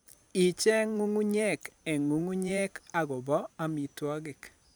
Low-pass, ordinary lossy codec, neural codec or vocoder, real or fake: none; none; vocoder, 44.1 kHz, 128 mel bands every 512 samples, BigVGAN v2; fake